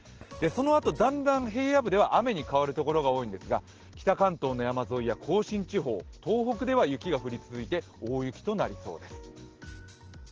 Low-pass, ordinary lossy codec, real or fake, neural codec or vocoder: 7.2 kHz; Opus, 16 kbps; real; none